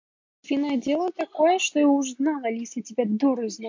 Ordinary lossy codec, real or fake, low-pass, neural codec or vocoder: MP3, 48 kbps; real; 7.2 kHz; none